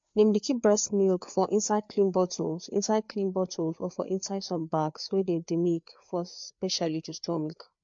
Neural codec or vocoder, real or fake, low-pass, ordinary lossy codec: codec, 16 kHz, 4 kbps, FreqCodec, larger model; fake; 7.2 kHz; MP3, 48 kbps